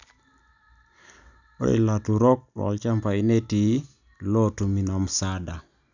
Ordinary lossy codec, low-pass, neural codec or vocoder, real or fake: none; 7.2 kHz; none; real